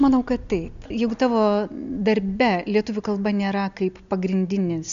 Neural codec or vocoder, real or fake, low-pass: none; real; 7.2 kHz